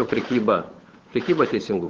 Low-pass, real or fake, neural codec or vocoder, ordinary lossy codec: 7.2 kHz; fake; codec, 16 kHz, 8 kbps, FunCodec, trained on Chinese and English, 25 frames a second; Opus, 16 kbps